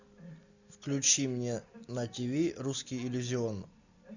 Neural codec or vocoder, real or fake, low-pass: none; real; 7.2 kHz